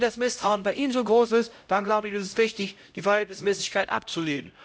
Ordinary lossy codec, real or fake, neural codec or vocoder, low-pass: none; fake; codec, 16 kHz, 0.5 kbps, X-Codec, HuBERT features, trained on LibriSpeech; none